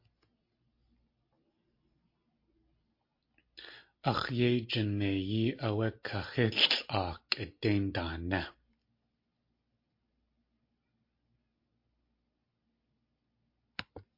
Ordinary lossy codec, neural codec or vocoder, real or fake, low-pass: MP3, 32 kbps; none; real; 5.4 kHz